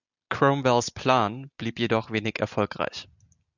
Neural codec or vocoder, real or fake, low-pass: none; real; 7.2 kHz